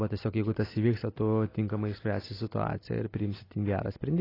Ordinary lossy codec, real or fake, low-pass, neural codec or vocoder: AAC, 24 kbps; real; 5.4 kHz; none